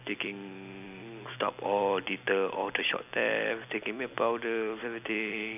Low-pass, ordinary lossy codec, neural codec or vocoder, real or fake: 3.6 kHz; none; none; real